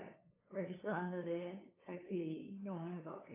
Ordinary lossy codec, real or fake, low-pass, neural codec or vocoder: AAC, 24 kbps; fake; 3.6 kHz; codec, 16 kHz, 2 kbps, FunCodec, trained on LibriTTS, 25 frames a second